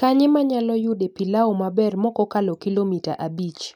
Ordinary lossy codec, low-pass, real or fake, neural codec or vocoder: none; 19.8 kHz; real; none